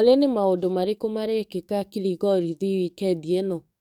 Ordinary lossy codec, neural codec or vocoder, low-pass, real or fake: none; codec, 44.1 kHz, 7.8 kbps, DAC; 19.8 kHz; fake